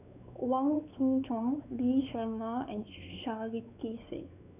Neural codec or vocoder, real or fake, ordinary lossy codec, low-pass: codec, 16 kHz, 4 kbps, X-Codec, WavLM features, trained on Multilingual LibriSpeech; fake; none; 3.6 kHz